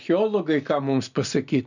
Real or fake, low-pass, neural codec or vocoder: real; 7.2 kHz; none